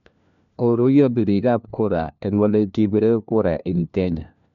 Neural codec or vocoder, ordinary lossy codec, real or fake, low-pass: codec, 16 kHz, 1 kbps, FunCodec, trained on LibriTTS, 50 frames a second; none; fake; 7.2 kHz